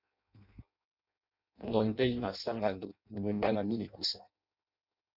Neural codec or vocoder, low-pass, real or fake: codec, 16 kHz in and 24 kHz out, 0.6 kbps, FireRedTTS-2 codec; 5.4 kHz; fake